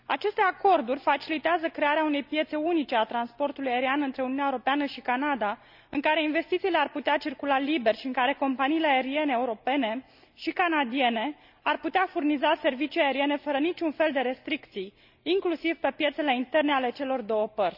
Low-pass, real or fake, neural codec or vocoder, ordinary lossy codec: 5.4 kHz; real; none; none